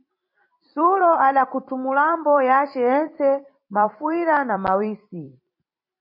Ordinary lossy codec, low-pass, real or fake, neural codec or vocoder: MP3, 32 kbps; 5.4 kHz; fake; vocoder, 44.1 kHz, 128 mel bands every 256 samples, BigVGAN v2